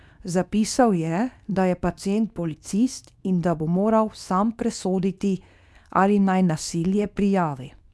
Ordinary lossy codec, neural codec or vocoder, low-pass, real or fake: none; codec, 24 kHz, 0.9 kbps, WavTokenizer, medium speech release version 2; none; fake